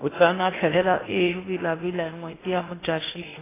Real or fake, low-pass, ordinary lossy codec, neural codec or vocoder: fake; 3.6 kHz; AAC, 16 kbps; codec, 16 kHz in and 24 kHz out, 0.8 kbps, FocalCodec, streaming, 65536 codes